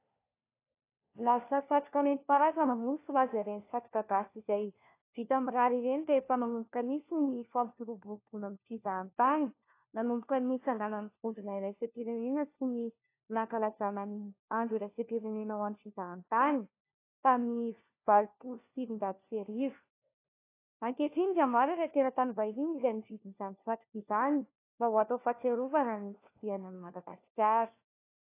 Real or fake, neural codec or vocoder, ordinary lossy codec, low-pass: fake; codec, 16 kHz, 1 kbps, FunCodec, trained on LibriTTS, 50 frames a second; AAC, 24 kbps; 3.6 kHz